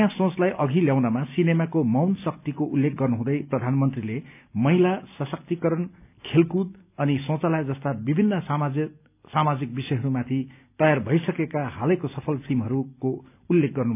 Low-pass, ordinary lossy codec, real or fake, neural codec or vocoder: 3.6 kHz; none; real; none